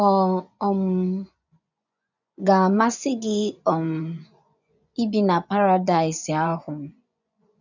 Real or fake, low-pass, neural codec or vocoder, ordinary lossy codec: fake; 7.2 kHz; vocoder, 24 kHz, 100 mel bands, Vocos; none